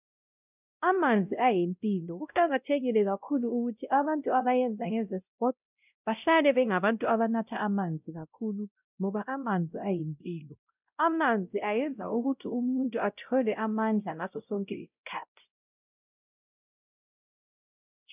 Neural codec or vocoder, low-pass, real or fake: codec, 16 kHz, 0.5 kbps, X-Codec, WavLM features, trained on Multilingual LibriSpeech; 3.6 kHz; fake